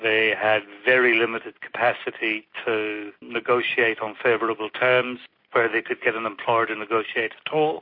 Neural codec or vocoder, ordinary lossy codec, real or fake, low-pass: none; MP3, 32 kbps; real; 5.4 kHz